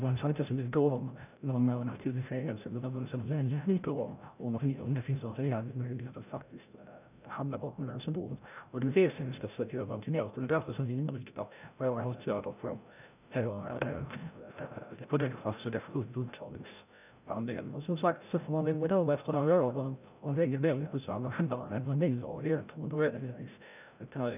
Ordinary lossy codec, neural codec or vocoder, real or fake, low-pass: none; codec, 16 kHz, 0.5 kbps, FreqCodec, larger model; fake; 3.6 kHz